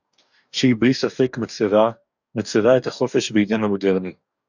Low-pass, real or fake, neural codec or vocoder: 7.2 kHz; fake; codec, 44.1 kHz, 2.6 kbps, DAC